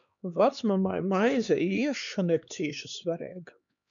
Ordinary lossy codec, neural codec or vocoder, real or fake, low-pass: MP3, 96 kbps; codec, 16 kHz, 2 kbps, X-Codec, HuBERT features, trained on LibriSpeech; fake; 7.2 kHz